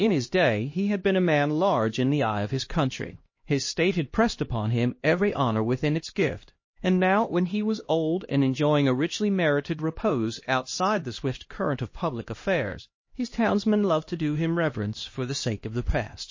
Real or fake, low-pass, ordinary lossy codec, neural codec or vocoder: fake; 7.2 kHz; MP3, 32 kbps; codec, 16 kHz, 1 kbps, X-Codec, HuBERT features, trained on LibriSpeech